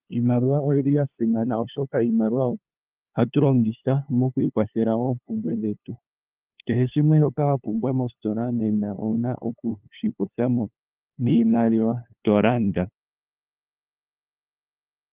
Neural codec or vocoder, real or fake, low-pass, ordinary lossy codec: codec, 16 kHz, 2 kbps, FunCodec, trained on LibriTTS, 25 frames a second; fake; 3.6 kHz; Opus, 32 kbps